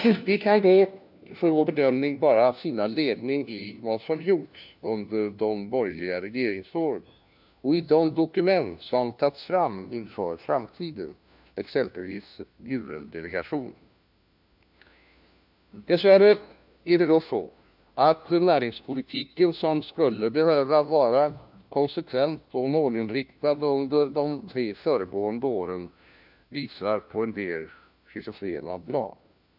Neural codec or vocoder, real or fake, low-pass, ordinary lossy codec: codec, 16 kHz, 1 kbps, FunCodec, trained on LibriTTS, 50 frames a second; fake; 5.4 kHz; none